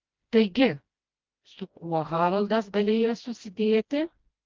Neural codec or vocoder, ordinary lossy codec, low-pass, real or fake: codec, 16 kHz, 1 kbps, FreqCodec, smaller model; Opus, 24 kbps; 7.2 kHz; fake